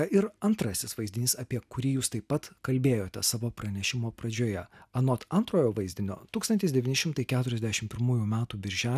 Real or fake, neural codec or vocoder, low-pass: real; none; 14.4 kHz